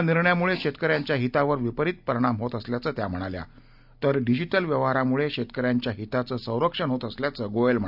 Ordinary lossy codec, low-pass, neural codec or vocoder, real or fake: none; 5.4 kHz; none; real